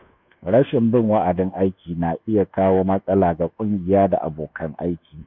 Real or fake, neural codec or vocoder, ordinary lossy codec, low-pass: fake; codec, 24 kHz, 1.2 kbps, DualCodec; none; 7.2 kHz